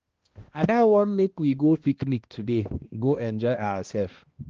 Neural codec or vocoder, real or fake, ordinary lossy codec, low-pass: codec, 16 kHz, 1 kbps, X-Codec, HuBERT features, trained on balanced general audio; fake; Opus, 16 kbps; 7.2 kHz